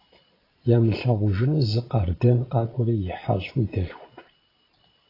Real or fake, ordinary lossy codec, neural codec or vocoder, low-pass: fake; AAC, 24 kbps; vocoder, 22.05 kHz, 80 mel bands, Vocos; 5.4 kHz